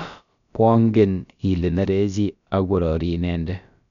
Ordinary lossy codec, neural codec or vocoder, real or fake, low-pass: none; codec, 16 kHz, about 1 kbps, DyCAST, with the encoder's durations; fake; 7.2 kHz